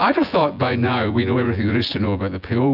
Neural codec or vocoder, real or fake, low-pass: vocoder, 24 kHz, 100 mel bands, Vocos; fake; 5.4 kHz